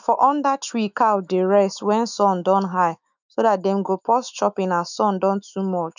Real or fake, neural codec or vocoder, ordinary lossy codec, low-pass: fake; autoencoder, 48 kHz, 128 numbers a frame, DAC-VAE, trained on Japanese speech; none; 7.2 kHz